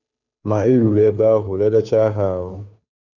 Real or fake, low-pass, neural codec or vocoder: fake; 7.2 kHz; codec, 16 kHz, 2 kbps, FunCodec, trained on Chinese and English, 25 frames a second